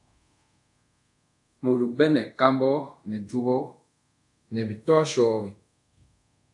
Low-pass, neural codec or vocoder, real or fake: 10.8 kHz; codec, 24 kHz, 0.5 kbps, DualCodec; fake